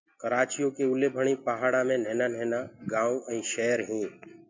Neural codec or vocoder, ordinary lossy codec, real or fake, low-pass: none; MP3, 64 kbps; real; 7.2 kHz